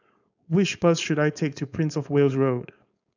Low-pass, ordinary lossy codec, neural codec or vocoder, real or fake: 7.2 kHz; none; codec, 16 kHz, 4.8 kbps, FACodec; fake